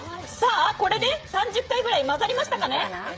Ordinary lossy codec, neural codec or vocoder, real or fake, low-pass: none; codec, 16 kHz, 16 kbps, FreqCodec, smaller model; fake; none